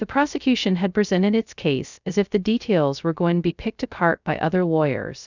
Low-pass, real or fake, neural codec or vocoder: 7.2 kHz; fake; codec, 16 kHz, 0.2 kbps, FocalCodec